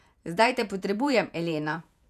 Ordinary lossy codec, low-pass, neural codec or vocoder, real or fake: none; 14.4 kHz; vocoder, 48 kHz, 128 mel bands, Vocos; fake